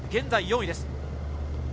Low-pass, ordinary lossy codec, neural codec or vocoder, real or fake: none; none; none; real